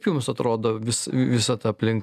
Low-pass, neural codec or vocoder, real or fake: 14.4 kHz; none; real